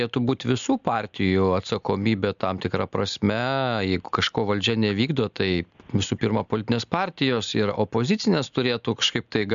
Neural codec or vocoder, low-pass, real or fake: none; 7.2 kHz; real